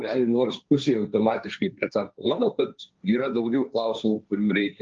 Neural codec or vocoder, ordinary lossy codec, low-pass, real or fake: codec, 16 kHz, 1.1 kbps, Voila-Tokenizer; Opus, 24 kbps; 7.2 kHz; fake